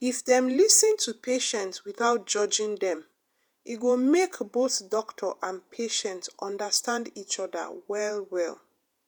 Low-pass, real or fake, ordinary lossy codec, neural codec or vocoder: none; fake; none; vocoder, 48 kHz, 128 mel bands, Vocos